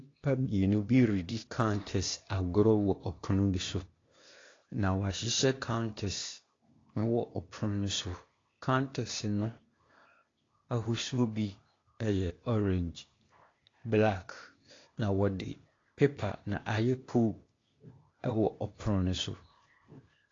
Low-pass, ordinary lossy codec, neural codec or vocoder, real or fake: 7.2 kHz; AAC, 32 kbps; codec, 16 kHz, 0.8 kbps, ZipCodec; fake